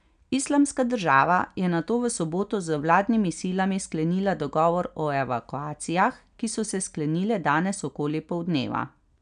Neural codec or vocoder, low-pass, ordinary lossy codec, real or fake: none; 9.9 kHz; none; real